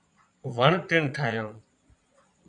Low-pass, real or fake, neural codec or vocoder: 9.9 kHz; fake; vocoder, 22.05 kHz, 80 mel bands, Vocos